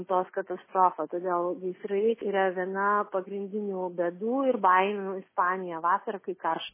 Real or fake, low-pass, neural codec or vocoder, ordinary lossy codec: real; 3.6 kHz; none; MP3, 16 kbps